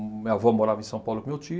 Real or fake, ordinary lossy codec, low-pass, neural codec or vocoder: real; none; none; none